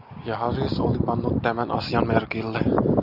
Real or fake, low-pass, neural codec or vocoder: real; 5.4 kHz; none